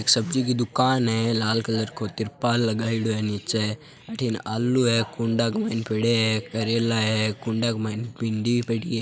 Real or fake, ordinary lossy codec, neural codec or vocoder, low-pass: real; none; none; none